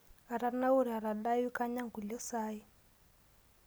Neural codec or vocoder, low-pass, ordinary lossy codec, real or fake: none; none; none; real